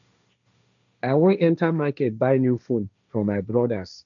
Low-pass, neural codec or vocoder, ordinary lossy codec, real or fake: 7.2 kHz; codec, 16 kHz, 1.1 kbps, Voila-Tokenizer; none; fake